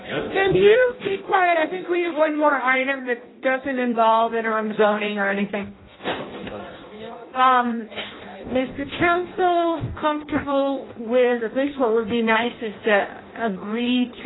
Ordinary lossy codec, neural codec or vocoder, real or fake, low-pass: AAC, 16 kbps; codec, 16 kHz in and 24 kHz out, 0.6 kbps, FireRedTTS-2 codec; fake; 7.2 kHz